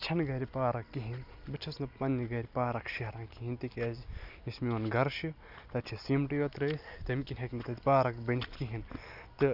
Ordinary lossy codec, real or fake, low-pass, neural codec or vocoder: none; real; 5.4 kHz; none